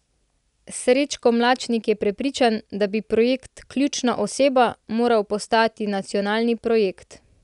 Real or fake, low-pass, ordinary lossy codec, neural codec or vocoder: real; 10.8 kHz; none; none